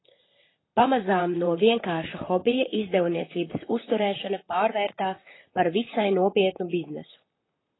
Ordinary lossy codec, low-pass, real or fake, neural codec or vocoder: AAC, 16 kbps; 7.2 kHz; fake; vocoder, 44.1 kHz, 128 mel bands, Pupu-Vocoder